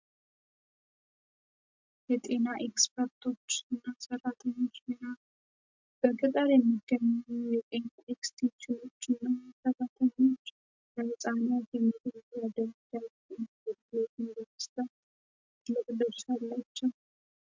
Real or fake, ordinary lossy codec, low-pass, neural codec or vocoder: real; MP3, 64 kbps; 7.2 kHz; none